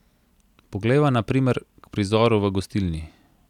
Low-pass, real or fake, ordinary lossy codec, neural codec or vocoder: 19.8 kHz; real; none; none